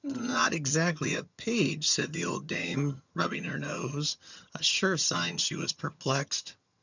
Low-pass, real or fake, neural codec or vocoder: 7.2 kHz; fake; vocoder, 22.05 kHz, 80 mel bands, HiFi-GAN